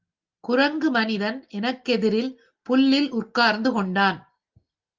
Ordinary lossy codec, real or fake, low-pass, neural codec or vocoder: Opus, 24 kbps; real; 7.2 kHz; none